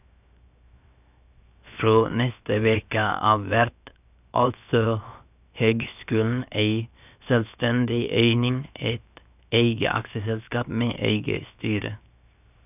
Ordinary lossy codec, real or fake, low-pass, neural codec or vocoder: none; fake; 3.6 kHz; codec, 16 kHz, 0.7 kbps, FocalCodec